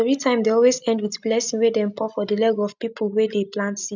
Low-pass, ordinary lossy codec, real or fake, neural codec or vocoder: 7.2 kHz; none; real; none